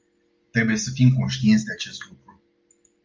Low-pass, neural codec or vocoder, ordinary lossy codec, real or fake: 7.2 kHz; none; Opus, 32 kbps; real